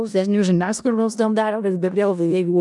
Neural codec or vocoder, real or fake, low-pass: codec, 16 kHz in and 24 kHz out, 0.4 kbps, LongCat-Audio-Codec, four codebook decoder; fake; 10.8 kHz